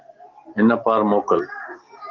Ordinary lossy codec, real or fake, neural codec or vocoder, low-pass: Opus, 16 kbps; real; none; 7.2 kHz